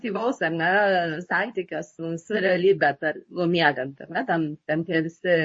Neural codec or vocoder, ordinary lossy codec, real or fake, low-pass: codec, 24 kHz, 0.9 kbps, WavTokenizer, medium speech release version 2; MP3, 32 kbps; fake; 9.9 kHz